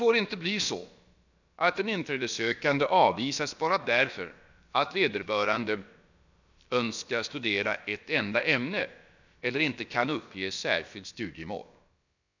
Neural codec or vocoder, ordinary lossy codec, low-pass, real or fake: codec, 16 kHz, about 1 kbps, DyCAST, with the encoder's durations; none; 7.2 kHz; fake